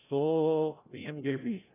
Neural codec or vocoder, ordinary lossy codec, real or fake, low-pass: codec, 16 kHz, 0.5 kbps, FreqCodec, larger model; AAC, 16 kbps; fake; 3.6 kHz